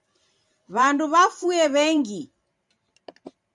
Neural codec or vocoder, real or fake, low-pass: vocoder, 44.1 kHz, 128 mel bands every 512 samples, BigVGAN v2; fake; 10.8 kHz